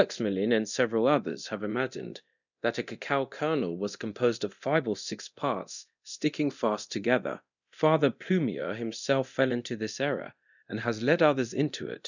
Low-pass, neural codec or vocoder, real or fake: 7.2 kHz; codec, 24 kHz, 0.9 kbps, DualCodec; fake